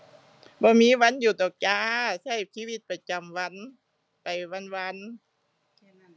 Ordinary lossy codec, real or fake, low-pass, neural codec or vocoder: none; real; none; none